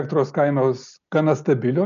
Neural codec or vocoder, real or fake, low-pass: none; real; 7.2 kHz